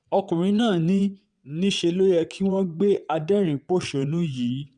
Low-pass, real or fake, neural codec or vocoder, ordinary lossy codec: 9.9 kHz; fake; vocoder, 22.05 kHz, 80 mel bands, WaveNeXt; none